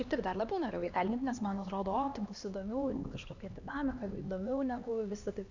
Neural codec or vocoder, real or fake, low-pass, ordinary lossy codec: codec, 16 kHz, 2 kbps, X-Codec, HuBERT features, trained on LibriSpeech; fake; 7.2 kHz; Opus, 64 kbps